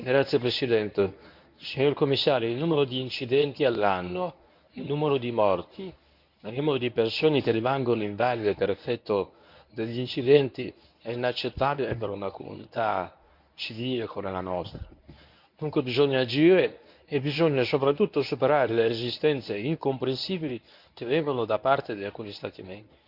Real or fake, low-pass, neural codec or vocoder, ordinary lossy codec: fake; 5.4 kHz; codec, 24 kHz, 0.9 kbps, WavTokenizer, medium speech release version 1; none